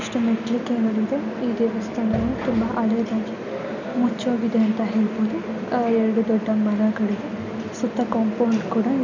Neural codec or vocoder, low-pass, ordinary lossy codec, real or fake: none; 7.2 kHz; none; real